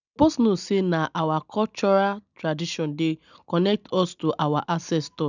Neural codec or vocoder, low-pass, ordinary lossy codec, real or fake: none; 7.2 kHz; none; real